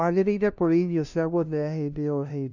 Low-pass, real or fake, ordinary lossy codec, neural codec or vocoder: 7.2 kHz; fake; none; codec, 16 kHz, 0.5 kbps, FunCodec, trained on LibriTTS, 25 frames a second